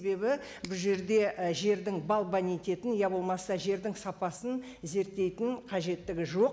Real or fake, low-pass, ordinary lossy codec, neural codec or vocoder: real; none; none; none